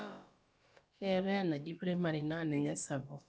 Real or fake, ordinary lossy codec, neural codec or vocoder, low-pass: fake; none; codec, 16 kHz, about 1 kbps, DyCAST, with the encoder's durations; none